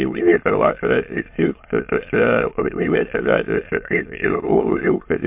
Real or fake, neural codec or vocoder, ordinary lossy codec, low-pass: fake; autoencoder, 22.05 kHz, a latent of 192 numbers a frame, VITS, trained on many speakers; MP3, 32 kbps; 3.6 kHz